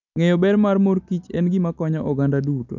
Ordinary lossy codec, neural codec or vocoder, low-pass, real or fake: MP3, 64 kbps; none; 7.2 kHz; real